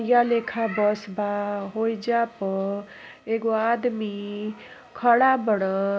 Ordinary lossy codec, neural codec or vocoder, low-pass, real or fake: none; none; none; real